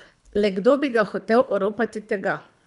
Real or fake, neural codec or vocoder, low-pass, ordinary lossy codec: fake; codec, 24 kHz, 3 kbps, HILCodec; 10.8 kHz; none